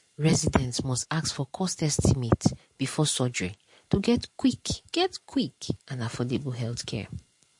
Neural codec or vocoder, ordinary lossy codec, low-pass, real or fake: vocoder, 44.1 kHz, 128 mel bands every 512 samples, BigVGAN v2; MP3, 48 kbps; 10.8 kHz; fake